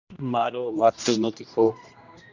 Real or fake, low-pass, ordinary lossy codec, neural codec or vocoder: fake; 7.2 kHz; Opus, 64 kbps; codec, 16 kHz, 1 kbps, X-Codec, HuBERT features, trained on general audio